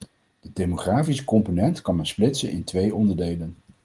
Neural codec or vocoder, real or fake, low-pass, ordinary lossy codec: none; real; 10.8 kHz; Opus, 24 kbps